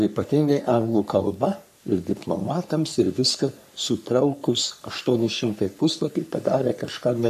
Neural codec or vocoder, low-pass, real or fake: codec, 44.1 kHz, 3.4 kbps, Pupu-Codec; 14.4 kHz; fake